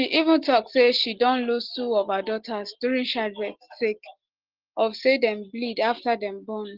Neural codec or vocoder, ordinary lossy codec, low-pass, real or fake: none; Opus, 16 kbps; 5.4 kHz; real